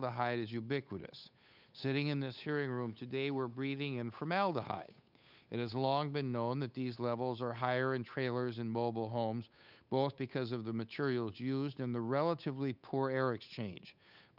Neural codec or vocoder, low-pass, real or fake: codec, 16 kHz, 8 kbps, FunCodec, trained on Chinese and English, 25 frames a second; 5.4 kHz; fake